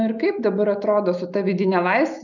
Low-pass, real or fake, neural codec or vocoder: 7.2 kHz; real; none